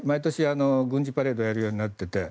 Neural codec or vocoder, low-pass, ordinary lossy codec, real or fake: none; none; none; real